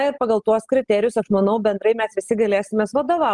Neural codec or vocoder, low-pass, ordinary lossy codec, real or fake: none; 10.8 kHz; Opus, 32 kbps; real